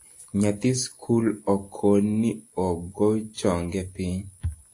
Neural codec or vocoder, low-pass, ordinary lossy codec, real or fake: none; 10.8 kHz; AAC, 48 kbps; real